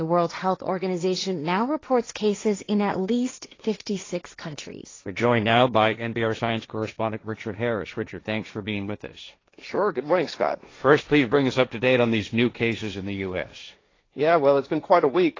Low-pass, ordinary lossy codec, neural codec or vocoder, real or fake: 7.2 kHz; AAC, 32 kbps; codec, 16 kHz, 1.1 kbps, Voila-Tokenizer; fake